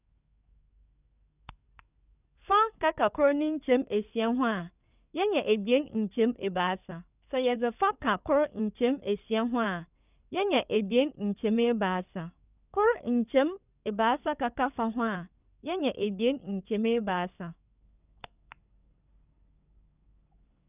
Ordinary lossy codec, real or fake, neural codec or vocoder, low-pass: none; fake; codec, 16 kHz in and 24 kHz out, 2.2 kbps, FireRedTTS-2 codec; 3.6 kHz